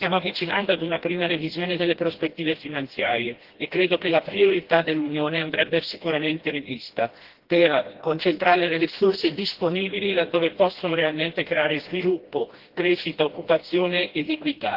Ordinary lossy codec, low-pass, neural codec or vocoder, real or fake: Opus, 16 kbps; 5.4 kHz; codec, 16 kHz, 1 kbps, FreqCodec, smaller model; fake